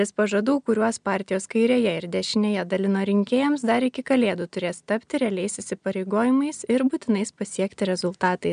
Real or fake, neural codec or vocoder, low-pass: real; none; 9.9 kHz